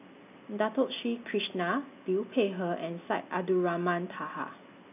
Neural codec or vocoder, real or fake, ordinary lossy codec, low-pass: none; real; none; 3.6 kHz